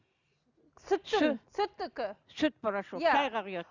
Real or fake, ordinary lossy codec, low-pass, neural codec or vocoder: real; Opus, 64 kbps; 7.2 kHz; none